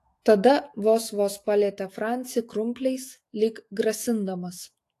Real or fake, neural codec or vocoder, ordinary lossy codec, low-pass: fake; autoencoder, 48 kHz, 128 numbers a frame, DAC-VAE, trained on Japanese speech; AAC, 48 kbps; 14.4 kHz